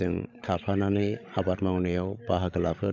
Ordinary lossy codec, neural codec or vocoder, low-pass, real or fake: none; codec, 16 kHz, 16 kbps, FreqCodec, larger model; none; fake